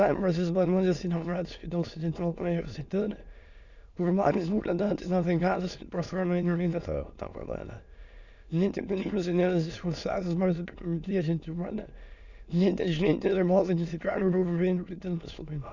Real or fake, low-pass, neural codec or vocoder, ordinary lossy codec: fake; 7.2 kHz; autoencoder, 22.05 kHz, a latent of 192 numbers a frame, VITS, trained on many speakers; none